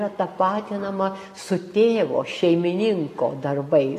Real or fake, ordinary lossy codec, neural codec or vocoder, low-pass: real; AAC, 48 kbps; none; 14.4 kHz